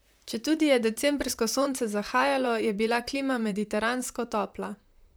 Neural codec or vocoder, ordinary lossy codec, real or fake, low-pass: vocoder, 44.1 kHz, 128 mel bands, Pupu-Vocoder; none; fake; none